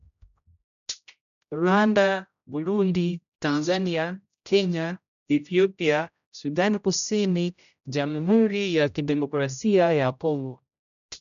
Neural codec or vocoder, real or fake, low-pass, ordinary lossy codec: codec, 16 kHz, 0.5 kbps, X-Codec, HuBERT features, trained on general audio; fake; 7.2 kHz; none